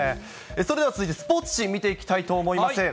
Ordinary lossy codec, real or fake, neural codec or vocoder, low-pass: none; real; none; none